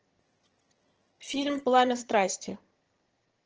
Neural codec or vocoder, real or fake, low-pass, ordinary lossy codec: vocoder, 22.05 kHz, 80 mel bands, HiFi-GAN; fake; 7.2 kHz; Opus, 16 kbps